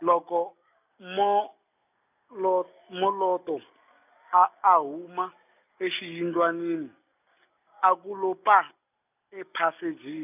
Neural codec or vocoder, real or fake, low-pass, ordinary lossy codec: none; real; 3.6 kHz; none